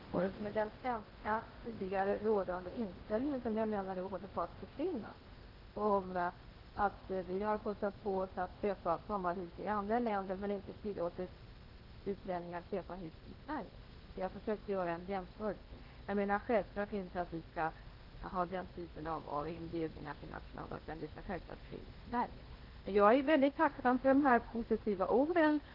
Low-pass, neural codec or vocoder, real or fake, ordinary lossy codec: 5.4 kHz; codec, 16 kHz in and 24 kHz out, 0.8 kbps, FocalCodec, streaming, 65536 codes; fake; Opus, 16 kbps